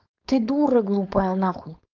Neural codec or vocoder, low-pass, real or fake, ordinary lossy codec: codec, 16 kHz, 4.8 kbps, FACodec; 7.2 kHz; fake; Opus, 24 kbps